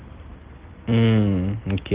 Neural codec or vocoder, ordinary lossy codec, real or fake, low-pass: none; Opus, 16 kbps; real; 3.6 kHz